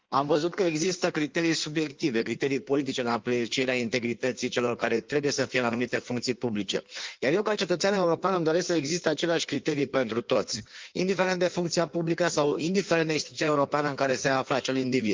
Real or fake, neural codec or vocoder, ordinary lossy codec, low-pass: fake; codec, 16 kHz in and 24 kHz out, 1.1 kbps, FireRedTTS-2 codec; Opus, 24 kbps; 7.2 kHz